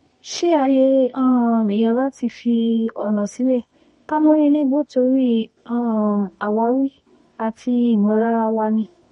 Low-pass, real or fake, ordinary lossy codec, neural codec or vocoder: 10.8 kHz; fake; MP3, 48 kbps; codec, 24 kHz, 0.9 kbps, WavTokenizer, medium music audio release